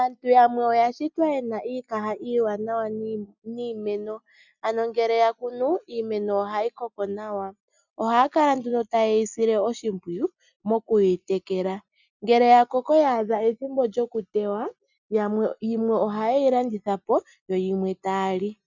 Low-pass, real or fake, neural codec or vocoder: 7.2 kHz; real; none